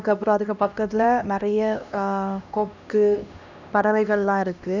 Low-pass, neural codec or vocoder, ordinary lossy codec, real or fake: 7.2 kHz; codec, 16 kHz, 1 kbps, X-Codec, HuBERT features, trained on LibriSpeech; none; fake